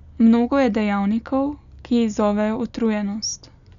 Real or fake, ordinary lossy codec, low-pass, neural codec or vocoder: real; none; 7.2 kHz; none